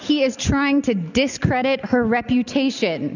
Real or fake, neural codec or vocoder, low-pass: real; none; 7.2 kHz